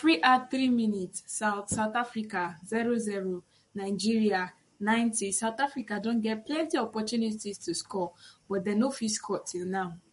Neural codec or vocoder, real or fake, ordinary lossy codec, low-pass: codec, 44.1 kHz, 7.8 kbps, Pupu-Codec; fake; MP3, 48 kbps; 14.4 kHz